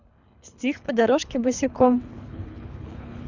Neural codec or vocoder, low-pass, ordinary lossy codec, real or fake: codec, 24 kHz, 3 kbps, HILCodec; 7.2 kHz; none; fake